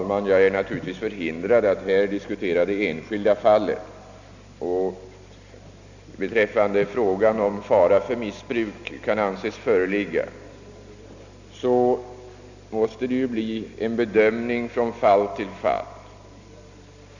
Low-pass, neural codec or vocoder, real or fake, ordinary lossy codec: 7.2 kHz; none; real; none